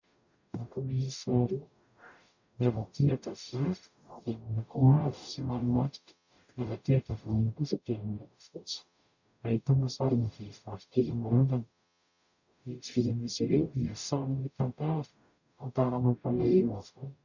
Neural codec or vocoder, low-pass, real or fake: codec, 44.1 kHz, 0.9 kbps, DAC; 7.2 kHz; fake